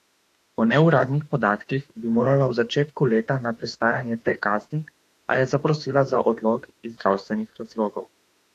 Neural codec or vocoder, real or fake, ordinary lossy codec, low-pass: autoencoder, 48 kHz, 32 numbers a frame, DAC-VAE, trained on Japanese speech; fake; AAC, 64 kbps; 14.4 kHz